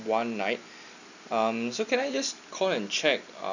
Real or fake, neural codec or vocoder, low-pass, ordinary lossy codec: real; none; 7.2 kHz; none